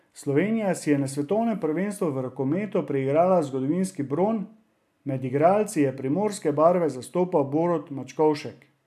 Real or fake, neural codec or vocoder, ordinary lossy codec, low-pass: real; none; AAC, 96 kbps; 14.4 kHz